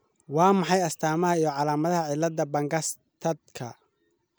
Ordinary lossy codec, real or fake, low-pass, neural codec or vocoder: none; real; none; none